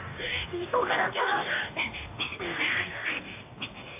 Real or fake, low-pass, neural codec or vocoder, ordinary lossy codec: fake; 3.6 kHz; codec, 44.1 kHz, 2.6 kbps, DAC; AAC, 24 kbps